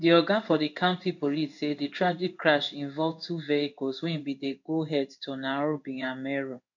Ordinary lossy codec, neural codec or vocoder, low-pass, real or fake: none; codec, 16 kHz in and 24 kHz out, 1 kbps, XY-Tokenizer; 7.2 kHz; fake